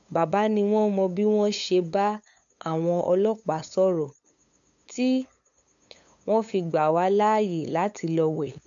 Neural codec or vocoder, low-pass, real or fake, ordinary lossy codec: codec, 16 kHz, 8 kbps, FunCodec, trained on LibriTTS, 25 frames a second; 7.2 kHz; fake; MP3, 96 kbps